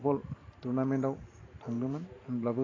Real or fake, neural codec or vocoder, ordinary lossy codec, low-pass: fake; vocoder, 44.1 kHz, 128 mel bands every 512 samples, BigVGAN v2; AAC, 32 kbps; 7.2 kHz